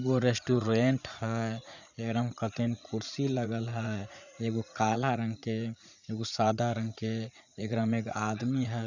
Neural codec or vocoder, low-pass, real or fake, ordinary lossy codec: vocoder, 44.1 kHz, 80 mel bands, Vocos; 7.2 kHz; fake; none